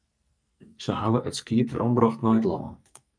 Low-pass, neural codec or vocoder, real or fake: 9.9 kHz; codec, 44.1 kHz, 2.6 kbps, SNAC; fake